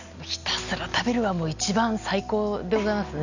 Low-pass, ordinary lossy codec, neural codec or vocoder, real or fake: 7.2 kHz; none; none; real